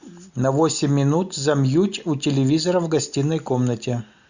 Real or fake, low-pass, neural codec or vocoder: real; 7.2 kHz; none